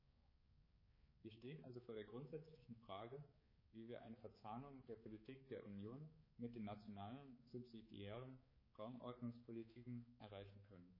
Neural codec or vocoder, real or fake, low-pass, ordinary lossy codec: codec, 16 kHz, 4 kbps, X-Codec, HuBERT features, trained on balanced general audio; fake; 5.4 kHz; MP3, 24 kbps